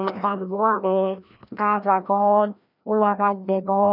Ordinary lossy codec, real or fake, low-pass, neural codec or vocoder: none; fake; 5.4 kHz; codec, 16 kHz, 1 kbps, FreqCodec, larger model